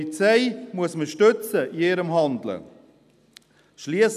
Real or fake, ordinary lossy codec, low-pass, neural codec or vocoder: real; none; 14.4 kHz; none